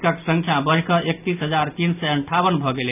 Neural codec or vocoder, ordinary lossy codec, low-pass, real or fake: none; none; 3.6 kHz; real